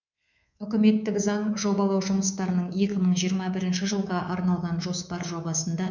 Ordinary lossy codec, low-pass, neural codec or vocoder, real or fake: none; 7.2 kHz; codec, 24 kHz, 3.1 kbps, DualCodec; fake